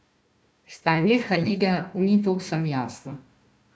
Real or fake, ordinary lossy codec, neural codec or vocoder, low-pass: fake; none; codec, 16 kHz, 1 kbps, FunCodec, trained on Chinese and English, 50 frames a second; none